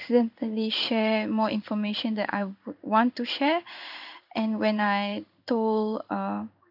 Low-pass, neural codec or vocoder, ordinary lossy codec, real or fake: 5.4 kHz; codec, 16 kHz in and 24 kHz out, 1 kbps, XY-Tokenizer; none; fake